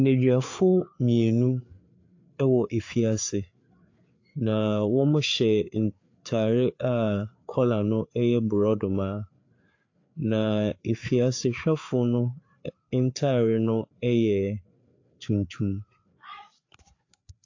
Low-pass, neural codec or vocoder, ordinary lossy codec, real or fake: 7.2 kHz; codec, 16 kHz, 4 kbps, FreqCodec, larger model; AAC, 48 kbps; fake